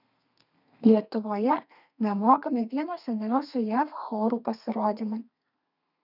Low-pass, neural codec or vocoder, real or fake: 5.4 kHz; codec, 32 kHz, 1.9 kbps, SNAC; fake